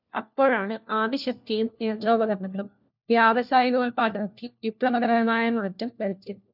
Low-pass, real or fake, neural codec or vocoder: 5.4 kHz; fake; codec, 16 kHz, 1 kbps, FunCodec, trained on LibriTTS, 50 frames a second